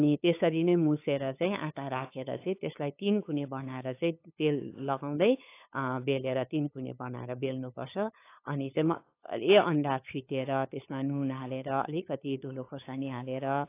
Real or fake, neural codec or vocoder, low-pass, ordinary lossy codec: fake; codec, 16 kHz, 8 kbps, FunCodec, trained on LibriTTS, 25 frames a second; 3.6 kHz; AAC, 24 kbps